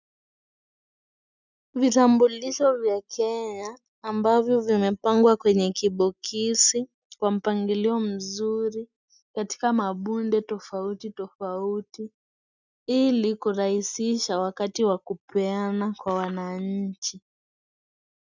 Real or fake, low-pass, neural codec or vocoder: real; 7.2 kHz; none